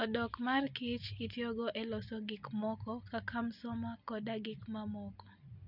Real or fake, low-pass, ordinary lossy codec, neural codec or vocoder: real; 5.4 kHz; none; none